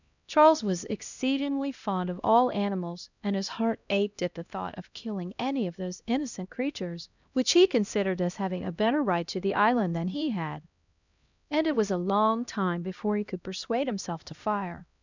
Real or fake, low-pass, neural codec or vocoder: fake; 7.2 kHz; codec, 16 kHz, 1 kbps, X-Codec, HuBERT features, trained on LibriSpeech